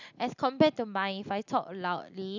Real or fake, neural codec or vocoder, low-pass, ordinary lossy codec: real; none; 7.2 kHz; none